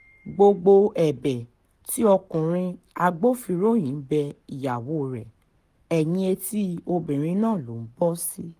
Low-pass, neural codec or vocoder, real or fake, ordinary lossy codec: 14.4 kHz; codec, 44.1 kHz, 7.8 kbps, Pupu-Codec; fake; Opus, 32 kbps